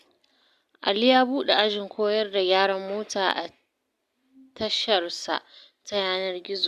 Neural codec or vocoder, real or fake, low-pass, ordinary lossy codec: none; real; 14.4 kHz; Opus, 64 kbps